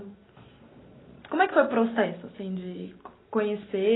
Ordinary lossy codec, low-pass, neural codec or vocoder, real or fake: AAC, 16 kbps; 7.2 kHz; none; real